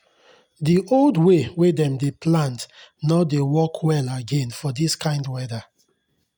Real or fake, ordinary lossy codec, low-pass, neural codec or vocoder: real; none; 19.8 kHz; none